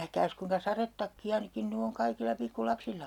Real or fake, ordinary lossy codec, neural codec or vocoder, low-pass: real; none; none; 19.8 kHz